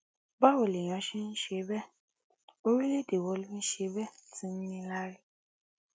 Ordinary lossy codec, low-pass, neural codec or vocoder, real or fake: none; none; none; real